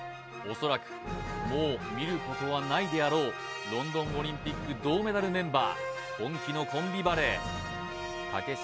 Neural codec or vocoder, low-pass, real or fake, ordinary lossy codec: none; none; real; none